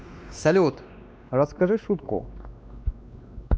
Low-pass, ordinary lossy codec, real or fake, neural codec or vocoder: none; none; fake; codec, 16 kHz, 2 kbps, X-Codec, WavLM features, trained on Multilingual LibriSpeech